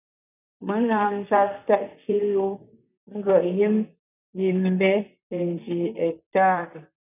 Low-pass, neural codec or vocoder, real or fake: 3.6 kHz; vocoder, 44.1 kHz, 128 mel bands, Pupu-Vocoder; fake